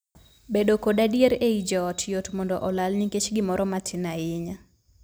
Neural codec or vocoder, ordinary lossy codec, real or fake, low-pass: none; none; real; none